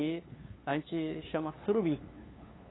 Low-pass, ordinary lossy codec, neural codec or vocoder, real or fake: 7.2 kHz; AAC, 16 kbps; codec, 16 kHz, 2 kbps, FunCodec, trained on LibriTTS, 25 frames a second; fake